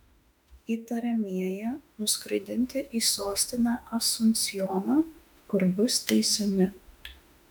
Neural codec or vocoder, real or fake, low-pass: autoencoder, 48 kHz, 32 numbers a frame, DAC-VAE, trained on Japanese speech; fake; 19.8 kHz